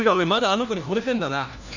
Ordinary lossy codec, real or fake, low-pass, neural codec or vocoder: none; fake; 7.2 kHz; codec, 16 kHz, 1 kbps, FunCodec, trained on LibriTTS, 50 frames a second